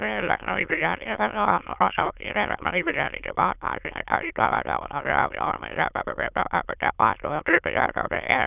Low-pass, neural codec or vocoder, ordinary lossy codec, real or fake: 3.6 kHz; autoencoder, 22.05 kHz, a latent of 192 numbers a frame, VITS, trained on many speakers; none; fake